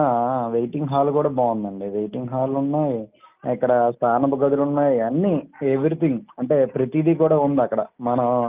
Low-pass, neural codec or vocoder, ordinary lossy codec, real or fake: 3.6 kHz; none; Opus, 24 kbps; real